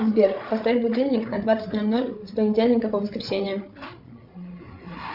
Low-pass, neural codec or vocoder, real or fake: 5.4 kHz; codec, 16 kHz, 8 kbps, FreqCodec, larger model; fake